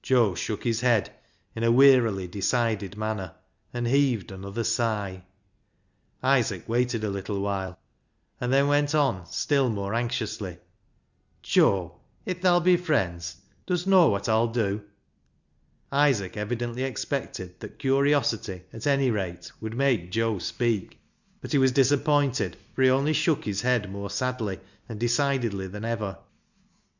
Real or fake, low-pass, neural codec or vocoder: real; 7.2 kHz; none